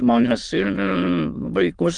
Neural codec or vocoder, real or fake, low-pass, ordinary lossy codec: autoencoder, 22.05 kHz, a latent of 192 numbers a frame, VITS, trained on many speakers; fake; 9.9 kHz; Opus, 32 kbps